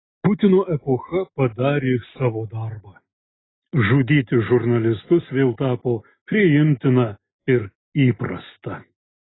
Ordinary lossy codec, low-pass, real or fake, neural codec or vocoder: AAC, 16 kbps; 7.2 kHz; real; none